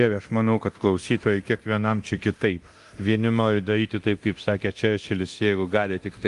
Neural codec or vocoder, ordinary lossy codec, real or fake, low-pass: codec, 24 kHz, 0.9 kbps, DualCodec; Opus, 32 kbps; fake; 10.8 kHz